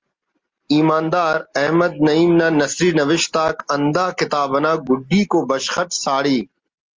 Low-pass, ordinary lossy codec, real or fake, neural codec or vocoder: 7.2 kHz; Opus, 32 kbps; real; none